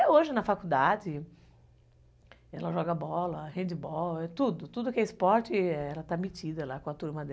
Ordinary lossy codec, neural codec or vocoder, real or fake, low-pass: none; none; real; none